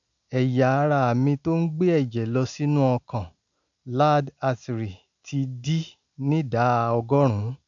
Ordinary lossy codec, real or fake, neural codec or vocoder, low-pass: none; real; none; 7.2 kHz